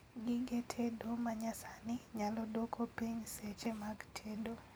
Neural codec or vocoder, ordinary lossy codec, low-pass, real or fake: none; none; none; real